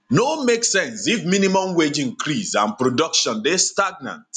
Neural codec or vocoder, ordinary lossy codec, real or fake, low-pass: none; none; real; 10.8 kHz